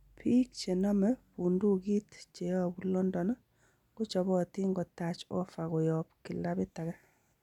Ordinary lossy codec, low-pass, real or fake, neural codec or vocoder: none; 19.8 kHz; real; none